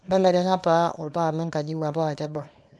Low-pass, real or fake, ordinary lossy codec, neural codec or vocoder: none; fake; none; codec, 24 kHz, 0.9 kbps, WavTokenizer, small release